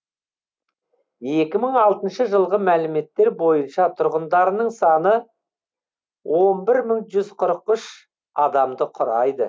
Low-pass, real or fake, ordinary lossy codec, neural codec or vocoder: none; real; none; none